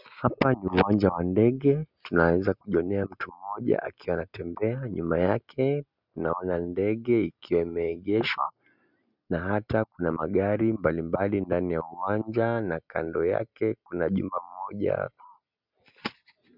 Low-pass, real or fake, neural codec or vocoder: 5.4 kHz; real; none